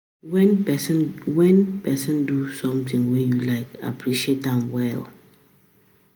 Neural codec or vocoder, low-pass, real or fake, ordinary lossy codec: none; none; real; none